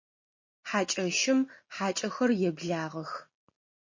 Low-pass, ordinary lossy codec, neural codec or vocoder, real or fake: 7.2 kHz; MP3, 32 kbps; none; real